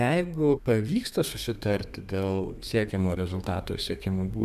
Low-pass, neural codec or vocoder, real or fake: 14.4 kHz; codec, 32 kHz, 1.9 kbps, SNAC; fake